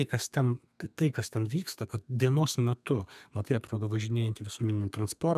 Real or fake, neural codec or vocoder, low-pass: fake; codec, 32 kHz, 1.9 kbps, SNAC; 14.4 kHz